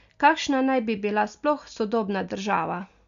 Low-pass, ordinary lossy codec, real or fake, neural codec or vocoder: 7.2 kHz; none; real; none